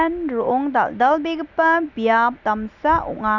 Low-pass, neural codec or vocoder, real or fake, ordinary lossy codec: 7.2 kHz; none; real; none